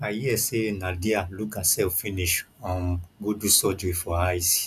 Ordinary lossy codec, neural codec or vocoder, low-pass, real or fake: none; none; 14.4 kHz; real